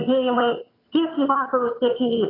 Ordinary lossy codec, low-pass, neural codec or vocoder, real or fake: AAC, 24 kbps; 5.4 kHz; vocoder, 22.05 kHz, 80 mel bands, HiFi-GAN; fake